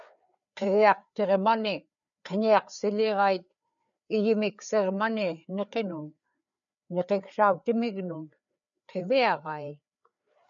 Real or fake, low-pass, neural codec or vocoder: fake; 7.2 kHz; codec, 16 kHz, 4 kbps, FreqCodec, larger model